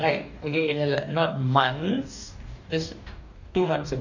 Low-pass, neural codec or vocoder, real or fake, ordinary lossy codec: 7.2 kHz; codec, 44.1 kHz, 2.6 kbps, DAC; fake; none